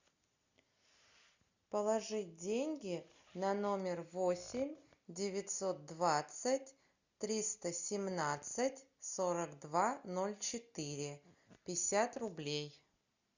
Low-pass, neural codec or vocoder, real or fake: 7.2 kHz; none; real